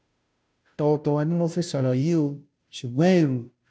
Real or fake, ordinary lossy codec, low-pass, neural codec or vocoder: fake; none; none; codec, 16 kHz, 0.5 kbps, FunCodec, trained on Chinese and English, 25 frames a second